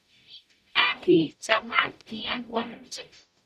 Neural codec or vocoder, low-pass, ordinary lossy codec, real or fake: codec, 44.1 kHz, 0.9 kbps, DAC; 14.4 kHz; none; fake